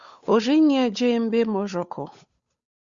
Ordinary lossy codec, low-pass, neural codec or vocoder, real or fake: Opus, 64 kbps; 7.2 kHz; codec, 16 kHz, 8 kbps, FunCodec, trained on Chinese and English, 25 frames a second; fake